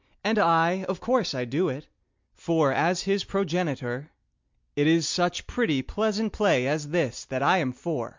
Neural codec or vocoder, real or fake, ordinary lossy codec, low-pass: none; real; MP3, 64 kbps; 7.2 kHz